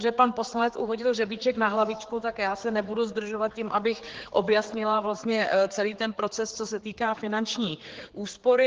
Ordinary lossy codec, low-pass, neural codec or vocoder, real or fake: Opus, 16 kbps; 7.2 kHz; codec, 16 kHz, 4 kbps, X-Codec, HuBERT features, trained on general audio; fake